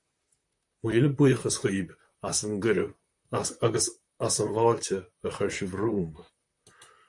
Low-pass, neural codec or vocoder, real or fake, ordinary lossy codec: 10.8 kHz; vocoder, 44.1 kHz, 128 mel bands, Pupu-Vocoder; fake; MP3, 64 kbps